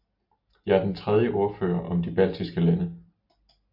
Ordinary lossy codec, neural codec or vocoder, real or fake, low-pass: MP3, 48 kbps; none; real; 5.4 kHz